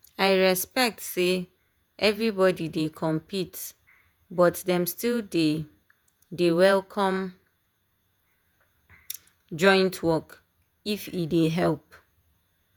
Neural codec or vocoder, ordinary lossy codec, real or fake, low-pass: vocoder, 48 kHz, 128 mel bands, Vocos; none; fake; none